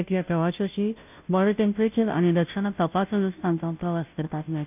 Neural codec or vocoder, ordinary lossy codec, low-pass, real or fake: codec, 16 kHz, 0.5 kbps, FunCodec, trained on Chinese and English, 25 frames a second; none; 3.6 kHz; fake